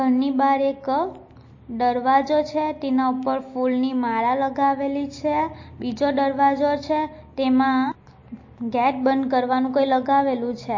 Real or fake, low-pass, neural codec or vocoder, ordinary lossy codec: real; 7.2 kHz; none; MP3, 32 kbps